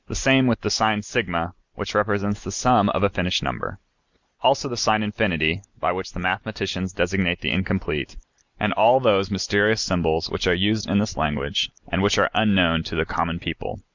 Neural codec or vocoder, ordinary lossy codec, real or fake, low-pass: none; Opus, 64 kbps; real; 7.2 kHz